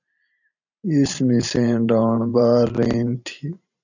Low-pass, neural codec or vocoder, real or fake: 7.2 kHz; vocoder, 44.1 kHz, 128 mel bands every 512 samples, BigVGAN v2; fake